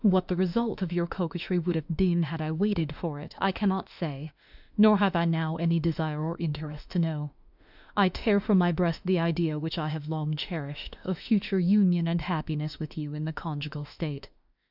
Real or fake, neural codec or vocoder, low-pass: fake; autoencoder, 48 kHz, 32 numbers a frame, DAC-VAE, trained on Japanese speech; 5.4 kHz